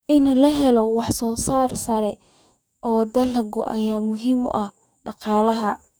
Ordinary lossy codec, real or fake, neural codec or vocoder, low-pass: none; fake; codec, 44.1 kHz, 2.6 kbps, DAC; none